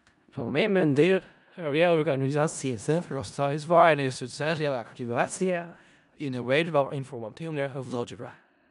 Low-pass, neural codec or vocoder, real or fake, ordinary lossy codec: 10.8 kHz; codec, 16 kHz in and 24 kHz out, 0.4 kbps, LongCat-Audio-Codec, four codebook decoder; fake; none